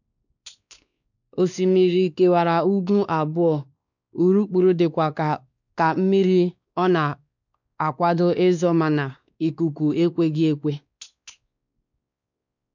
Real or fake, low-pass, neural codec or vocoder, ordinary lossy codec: fake; 7.2 kHz; codec, 16 kHz, 2 kbps, X-Codec, WavLM features, trained on Multilingual LibriSpeech; none